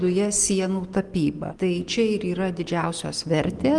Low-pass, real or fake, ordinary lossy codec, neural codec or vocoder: 10.8 kHz; real; Opus, 24 kbps; none